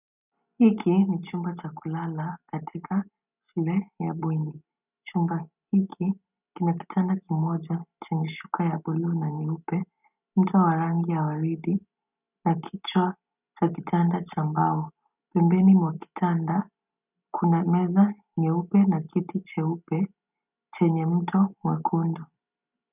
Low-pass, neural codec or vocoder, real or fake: 3.6 kHz; none; real